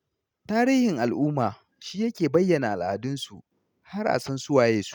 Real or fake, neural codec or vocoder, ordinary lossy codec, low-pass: real; none; none; 19.8 kHz